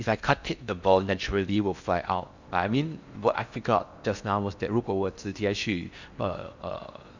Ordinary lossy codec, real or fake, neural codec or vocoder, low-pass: Opus, 64 kbps; fake; codec, 16 kHz in and 24 kHz out, 0.6 kbps, FocalCodec, streaming, 4096 codes; 7.2 kHz